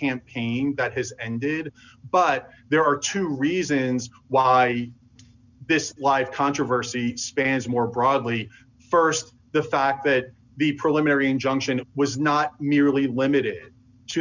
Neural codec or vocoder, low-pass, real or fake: none; 7.2 kHz; real